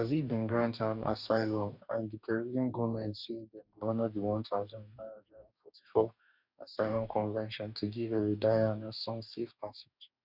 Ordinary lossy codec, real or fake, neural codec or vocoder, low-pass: none; fake; codec, 44.1 kHz, 2.6 kbps, DAC; 5.4 kHz